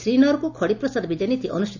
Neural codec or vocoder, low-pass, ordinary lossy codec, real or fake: none; 7.2 kHz; none; real